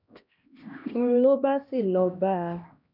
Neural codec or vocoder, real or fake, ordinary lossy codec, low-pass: codec, 16 kHz, 1 kbps, X-Codec, HuBERT features, trained on LibriSpeech; fake; none; 5.4 kHz